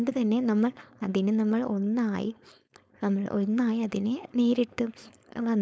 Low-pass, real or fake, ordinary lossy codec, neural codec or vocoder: none; fake; none; codec, 16 kHz, 4.8 kbps, FACodec